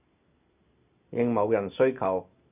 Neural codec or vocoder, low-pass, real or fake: none; 3.6 kHz; real